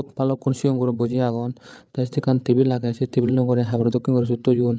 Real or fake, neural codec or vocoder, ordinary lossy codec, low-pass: fake; codec, 16 kHz, 8 kbps, FreqCodec, larger model; none; none